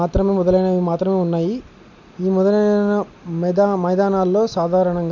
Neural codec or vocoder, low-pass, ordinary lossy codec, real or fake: none; 7.2 kHz; none; real